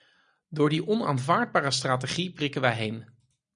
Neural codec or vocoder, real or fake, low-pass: none; real; 9.9 kHz